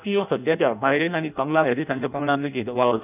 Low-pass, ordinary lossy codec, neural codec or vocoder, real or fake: 3.6 kHz; none; codec, 16 kHz in and 24 kHz out, 0.6 kbps, FireRedTTS-2 codec; fake